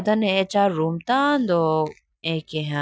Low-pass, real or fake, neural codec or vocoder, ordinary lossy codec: none; real; none; none